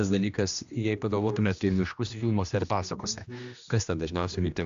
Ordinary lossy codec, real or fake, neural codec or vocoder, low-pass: AAC, 64 kbps; fake; codec, 16 kHz, 1 kbps, X-Codec, HuBERT features, trained on general audio; 7.2 kHz